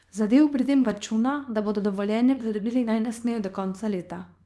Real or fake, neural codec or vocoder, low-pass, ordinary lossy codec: fake; codec, 24 kHz, 0.9 kbps, WavTokenizer, small release; none; none